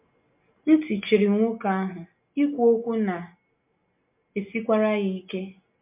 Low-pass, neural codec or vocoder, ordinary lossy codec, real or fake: 3.6 kHz; none; MP3, 32 kbps; real